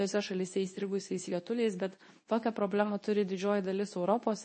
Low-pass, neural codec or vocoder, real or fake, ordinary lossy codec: 9.9 kHz; codec, 24 kHz, 0.9 kbps, WavTokenizer, medium speech release version 2; fake; MP3, 32 kbps